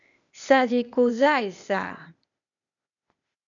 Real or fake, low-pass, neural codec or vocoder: fake; 7.2 kHz; codec, 16 kHz, 0.8 kbps, ZipCodec